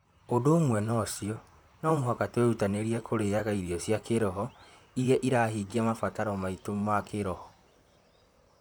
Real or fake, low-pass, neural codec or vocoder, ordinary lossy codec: fake; none; vocoder, 44.1 kHz, 128 mel bands, Pupu-Vocoder; none